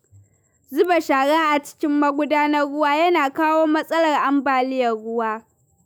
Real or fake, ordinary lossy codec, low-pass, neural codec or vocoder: fake; none; none; autoencoder, 48 kHz, 128 numbers a frame, DAC-VAE, trained on Japanese speech